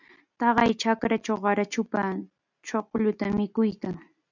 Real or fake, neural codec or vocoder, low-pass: real; none; 7.2 kHz